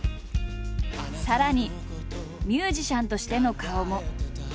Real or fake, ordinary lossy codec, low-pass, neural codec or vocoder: real; none; none; none